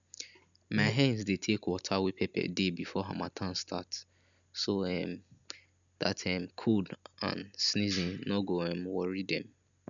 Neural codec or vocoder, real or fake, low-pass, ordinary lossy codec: none; real; 7.2 kHz; none